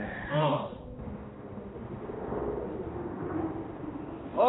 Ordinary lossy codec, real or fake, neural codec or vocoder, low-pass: AAC, 16 kbps; fake; codec, 16 kHz, 1 kbps, X-Codec, HuBERT features, trained on balanced general audio; 7.2 kHz